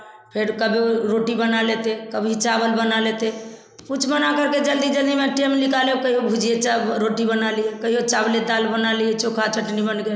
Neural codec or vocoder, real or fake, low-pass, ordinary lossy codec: none; real; none; none